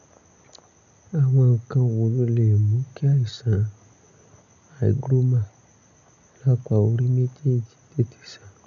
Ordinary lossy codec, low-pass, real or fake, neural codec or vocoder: none; 7.2 kHz; real; none